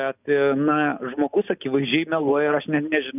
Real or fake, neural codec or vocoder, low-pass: real; none; 3.6 kHz